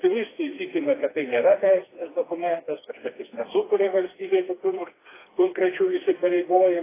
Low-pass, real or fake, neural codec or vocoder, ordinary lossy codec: 3.6 kHz; fake; codec, 16 kHz, 2 kbps, FreqCodec, smaller model; AAC, 16 kbps